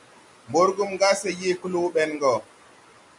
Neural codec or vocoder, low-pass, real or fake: none; 10.8 kHz; real